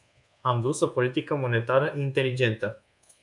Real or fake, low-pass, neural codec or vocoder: fake; 10.8 kHz; codec, 24 kHz, 1.2 kbps, DualCodec